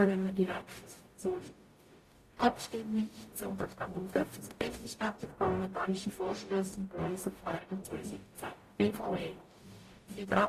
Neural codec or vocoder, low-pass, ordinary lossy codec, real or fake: codec, 44.1 kHz, 0.9 kbps, DAC; 14.4 kHz; AAC, 48 kbps; fake